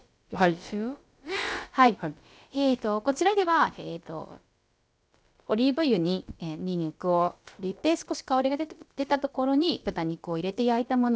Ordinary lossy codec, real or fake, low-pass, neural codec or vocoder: none; fake; none; codec, 16 kHz, about 1 kbps, DyCAST, with the encoder's durations